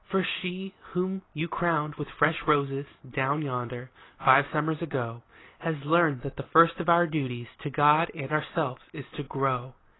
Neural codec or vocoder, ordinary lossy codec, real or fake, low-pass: none; AAC, 16 kbps; real; 7.2 kHz